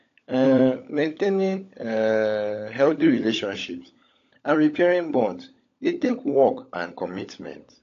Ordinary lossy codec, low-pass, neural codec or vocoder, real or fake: AAC, 48 kbps; 7.2 kHz; codec, 16 kHz, 16 kbps, FunCodec, trained on LibriTTS, 50 frames a second; fake